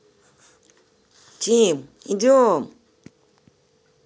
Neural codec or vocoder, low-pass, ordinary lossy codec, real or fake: none; none; none; real